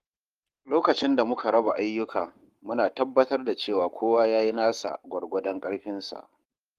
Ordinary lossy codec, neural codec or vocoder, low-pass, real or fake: Opus, 24 kbps; codec, 44.1 kHz, 7.8 kbps, Pupu-Codec; 14.4 kHz; fake